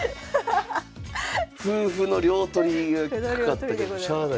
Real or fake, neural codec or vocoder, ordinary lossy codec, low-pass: real; none; none; none